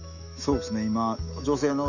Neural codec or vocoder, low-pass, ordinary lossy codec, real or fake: autoencoder, 48 kHz, 128 numbers a frame, DAC-VAE, trained on Japanese speech; 7.2 kHz; none; fake